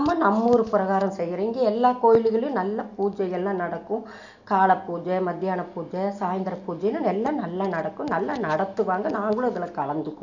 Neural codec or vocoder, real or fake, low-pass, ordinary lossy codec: none; real; 7.2 kHz; none